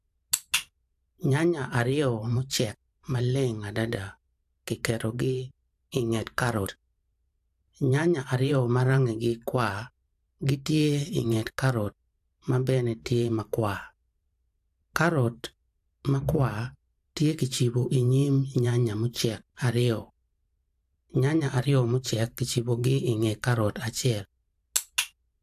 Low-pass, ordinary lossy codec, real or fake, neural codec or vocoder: 14.4 kHz; AAC, 96 kbps; fake; vocoder, 44.1 kHz, 128 mel bands every 512 samples, BigVGAN v2